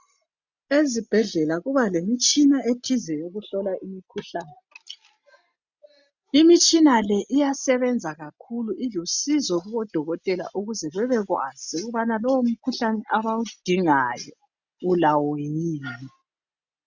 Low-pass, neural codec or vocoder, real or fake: 7.2 kHz; none; real